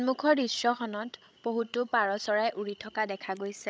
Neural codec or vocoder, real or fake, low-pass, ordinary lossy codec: codec, 16 kHz, 16 kbps, FreqCodec, larger model; fake; none; none